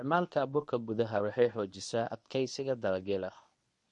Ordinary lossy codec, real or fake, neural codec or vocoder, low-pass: MP3, 48 kbps; fake; codec, 24 kHz, 0.9 kbps, WavTokenizer, medium speech release version 1; 10.8 kHz